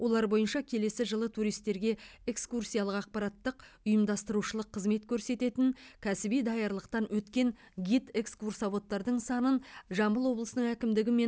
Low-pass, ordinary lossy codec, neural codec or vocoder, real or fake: none; none; none; real